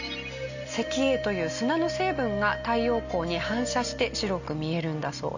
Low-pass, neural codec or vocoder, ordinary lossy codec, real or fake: 7.2 kHz; none; Opus, 64 kbps; real